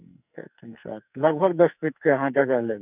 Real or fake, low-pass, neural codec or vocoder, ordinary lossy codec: fake; 3.6 kHz; codec, 44.1 kHz, 2.6 kbps, SNAC; none